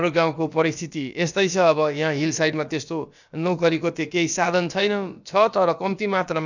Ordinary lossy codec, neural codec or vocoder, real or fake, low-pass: none; codec, 16 kHz, about 1 kbps, DyCAST, with the encoder's durations; fake; 7.2 kHz